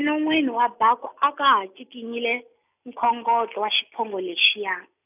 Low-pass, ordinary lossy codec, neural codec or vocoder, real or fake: 3.6 kHz; none; none; real